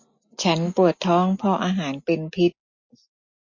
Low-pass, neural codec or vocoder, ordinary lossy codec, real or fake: 7.2 kHz; none; MP3, 32 kbps; real